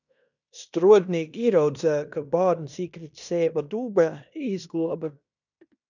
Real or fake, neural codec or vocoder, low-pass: fake; codec, 16 kHz in and 24 kHz out, 0.9 kbps, LongCat-Audio-Codec, fine tuned four codebook decoder; 7.2 kHz